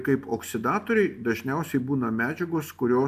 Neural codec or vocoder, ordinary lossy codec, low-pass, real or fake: none; AAC, 96 kbps; 14.4 kHz; real